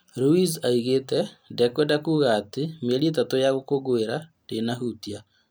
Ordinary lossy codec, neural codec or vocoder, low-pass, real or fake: none; none; none; real